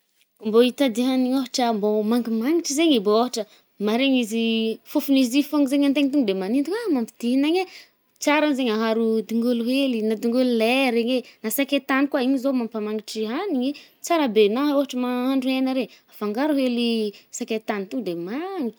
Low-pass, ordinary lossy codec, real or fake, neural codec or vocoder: none; none; real; none